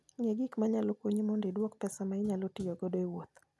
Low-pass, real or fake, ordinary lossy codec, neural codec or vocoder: none; real; none; none